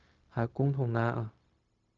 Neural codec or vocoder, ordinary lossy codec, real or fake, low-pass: codec, 16 kHz, 0.4 kbps, LongCat-Audio-Codec; Opus, 24 kbps; fake; 7.2 kHz